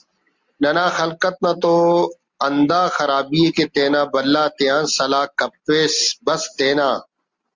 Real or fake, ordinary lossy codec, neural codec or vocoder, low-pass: real; Opus, 32 kbps; none; 7.2 kHz